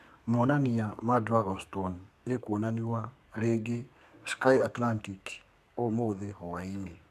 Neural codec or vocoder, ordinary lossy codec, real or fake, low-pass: codec, 32 kHz, 1.9 kbps, SNAC; none; fake; 14.4 kHz